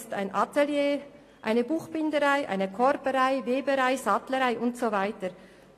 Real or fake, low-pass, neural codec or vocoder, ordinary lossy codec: real; 14.4 kHz; none; AAC, 48 kbps